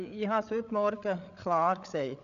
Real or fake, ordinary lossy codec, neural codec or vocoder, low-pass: fake; none; codec, 16 kHz, 8 kbps, FreqCodec, larger model; 7.2 kHz